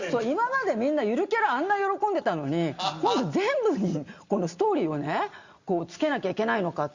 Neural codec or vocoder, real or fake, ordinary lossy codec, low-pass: none; real; Opus, 64 kbps; 7.2 kHz